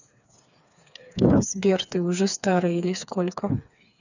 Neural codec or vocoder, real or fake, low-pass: codec, 16 kHz, 4 kbps, FreqCodec, smaller model; fake; 7.2 kHz